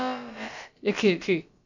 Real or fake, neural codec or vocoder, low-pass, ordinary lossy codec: fake; codec, 16 kHz, about 1 kbps, DyCAST, with the encoder's durations; 7.2 kHz; none